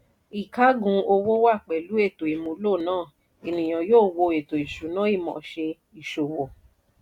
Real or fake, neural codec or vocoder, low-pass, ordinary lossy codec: real; none; 19.8 kHz; none